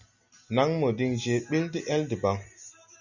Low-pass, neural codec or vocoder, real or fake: 7.2 kHz; none; real